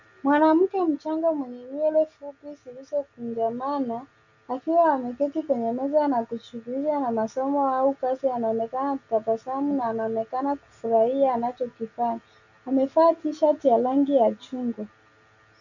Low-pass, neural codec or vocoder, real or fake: 7.2 kHz; none; real